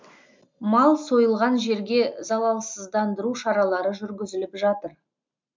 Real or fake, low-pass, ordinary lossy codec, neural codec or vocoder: real; 7.2 kHz; MP3, 64 kbps; none